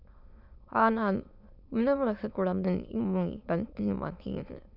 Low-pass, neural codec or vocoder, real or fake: 5.4 kHz; autoencoder, 22.05 kHz, a latent of 192 numbers a frame, VITS, trained on many speakers; fake